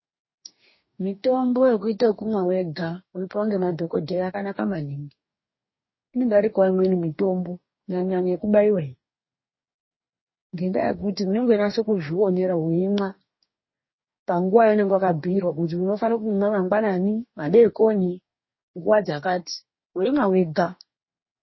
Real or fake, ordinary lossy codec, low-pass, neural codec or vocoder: fake; MP3, 24 kbps; 7.2 kHz; codec, 44.1 kHz, 2.6 kbps, DAC